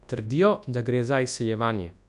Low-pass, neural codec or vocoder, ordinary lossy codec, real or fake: 10.8 kHz; codec, 24 kHz, 0.9 kbps, WavTokenizer, large speech release; none; fake